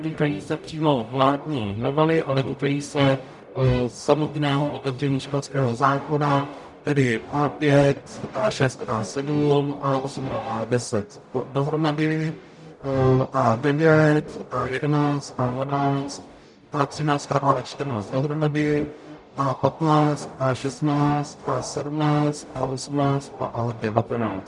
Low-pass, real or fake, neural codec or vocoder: 10.8 kHz; fake; codec, 44.1 kHz, 0.9 kbps, DAC